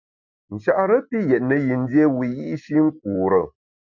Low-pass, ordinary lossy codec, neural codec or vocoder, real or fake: 7.2 kHz; MP3, 64 kbps; none; real